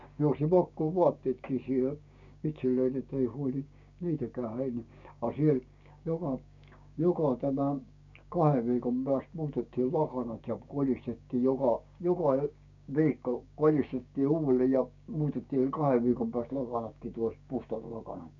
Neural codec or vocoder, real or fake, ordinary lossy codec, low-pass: codec, 16 kHz, 6 kbps, DAC; fake; none; 7.2 kHz